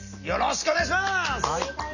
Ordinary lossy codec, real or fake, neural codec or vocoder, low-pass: none; real; none; 7.2 kHz